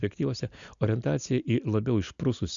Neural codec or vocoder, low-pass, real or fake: none; 7.2 kHz; real